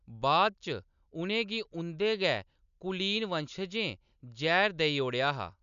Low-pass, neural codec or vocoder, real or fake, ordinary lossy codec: 7.2 kHz; none; real; none